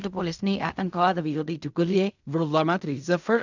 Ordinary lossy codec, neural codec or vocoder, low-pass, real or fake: none; codec, 16 kHz in and 24 kHz out, 0.4 kbps, LongCat-Audio-Codec, fine tuned four codebook decoder; 7.2 kHz; fake